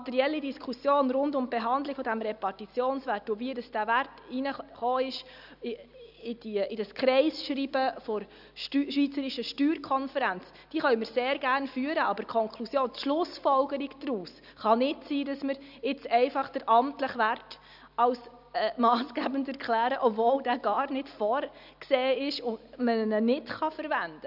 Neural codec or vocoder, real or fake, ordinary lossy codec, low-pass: none; real; none; 5.4 kHz